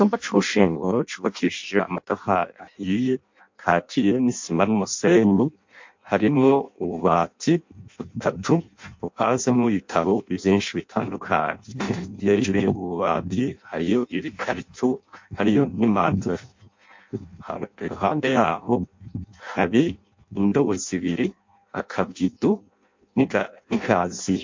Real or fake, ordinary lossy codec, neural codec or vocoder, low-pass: fake; MP3, 48 kbps; codec, 16 kHz in and 24 kHz out, 0.6 kbps, FireRedTTS-2 codec; 7.2 kHz